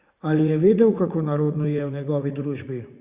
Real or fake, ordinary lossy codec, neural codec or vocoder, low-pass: fake; Opus, 64 kbps; vocoder, 44.1 kHz, 80 mel bands, Vocos; 3.6 kHz